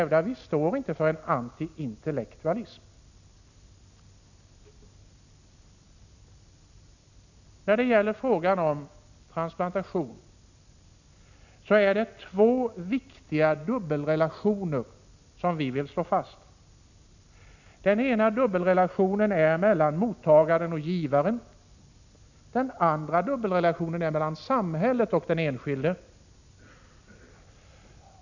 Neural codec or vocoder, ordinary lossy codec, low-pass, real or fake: none; none; 7.2 kHz; real